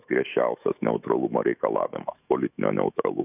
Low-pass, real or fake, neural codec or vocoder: 3.6 kHz; fake; autoencoder, 48 kHz, 128 numbers a frame, DAC-VAE, trained on Japanese speech